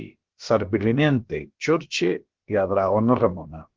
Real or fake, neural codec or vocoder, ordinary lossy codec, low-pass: fake; codec, 16 kHz, about 1 kbps, DyCAST, with the encoder's durations; Opus, 16 kbps; 7.2 kHz